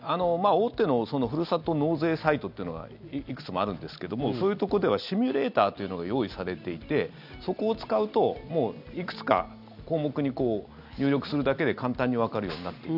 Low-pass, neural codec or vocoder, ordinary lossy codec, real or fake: 5.4 kHz; none; none; real